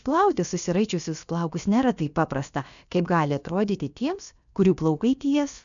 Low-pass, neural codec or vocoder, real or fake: 7.2 kHz; codec, 16 kHz, about 1 kbps, DyCAST, with the encoder's durations; fake